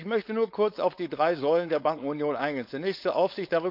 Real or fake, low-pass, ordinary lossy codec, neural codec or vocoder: fake; 5.4 kHz; none; codec, 16 kHz, 4.8 kbps, FACodec